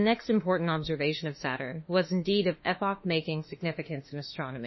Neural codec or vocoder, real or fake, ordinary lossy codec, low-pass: autoencoder, 48 kHz, 32 numbers a frame, DAC-VAE, trained on Japanese speech; fake; MP3, 24 kbps; 7.2 kHz